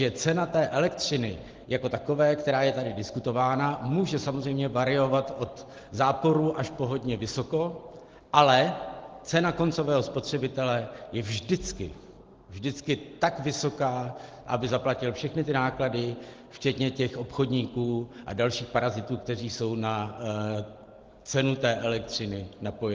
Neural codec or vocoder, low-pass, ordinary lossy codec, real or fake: none; 7.2 kHz; Opus, 16 kbps; real